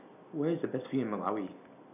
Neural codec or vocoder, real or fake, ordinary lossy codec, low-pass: none; real; none; 3.6 kHz